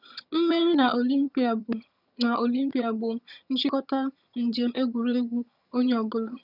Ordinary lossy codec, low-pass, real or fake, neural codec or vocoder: none; 5.4 kHz; fake; vocoder, 22.05 kHz, 80 mel bands, HiFi-GAN